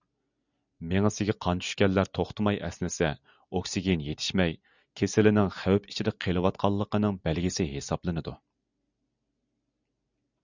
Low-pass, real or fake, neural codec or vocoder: 7.2 kHz; real; none